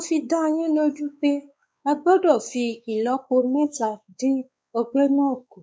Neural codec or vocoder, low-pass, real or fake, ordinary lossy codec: codec, 16 kHz, 4 kbps, X-Codec, WavLM features, trained on Multilingual LibriSpeech; none; fake; none